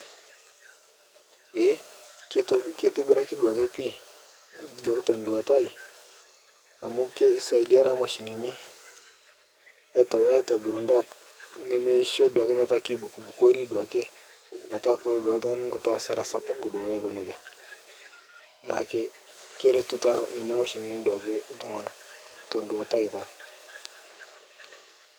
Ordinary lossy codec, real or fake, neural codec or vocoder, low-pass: none; fake; codec, 44.1 kHz, 2.6 kbps, SNAC; none